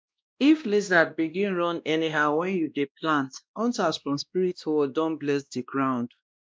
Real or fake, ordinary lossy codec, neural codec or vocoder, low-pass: fake; none; codec, 16 kHz, 1 kbps, X-Codec, WavLM features, trained on Multilingual LibriSpeech; none